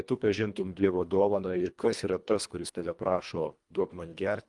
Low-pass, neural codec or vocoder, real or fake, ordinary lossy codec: 10.8 kHz; codec, 24 kHz, 1.5 kbps, HILCodec; fake; Opus, 32 kbps